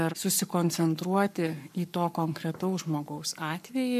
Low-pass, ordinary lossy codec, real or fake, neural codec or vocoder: 14.4 kHz; MP3, 96 kbps; fake; codec, 44.1 kHz, 7.8 kbps, Pupu-Codec